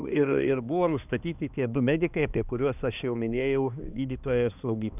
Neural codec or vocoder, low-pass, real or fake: codec, 16 kHz, 2 kbps, X-Codec, HuBERT features, trained on balanced general audio; 3.6 kHz; fake